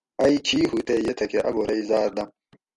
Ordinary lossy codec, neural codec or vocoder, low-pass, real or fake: MP3, 96 kbps; none; 10.8 kHz; real